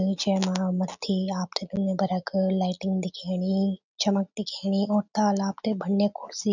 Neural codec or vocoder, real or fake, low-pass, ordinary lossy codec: none; real; 7.2 kHz; none